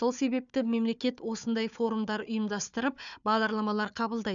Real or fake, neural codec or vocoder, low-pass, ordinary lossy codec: fake; codec, 16 kHz, 4 kbps, FunCodec, trained on Chinese and English, 50 frames a second; 7.2 kHz; none